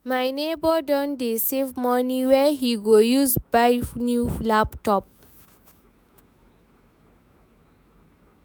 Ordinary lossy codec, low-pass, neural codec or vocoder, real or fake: none; none; autoencoder, 48 kHz, 128 numbers a frame, DAC-VAE, trained on Japanese speech; fake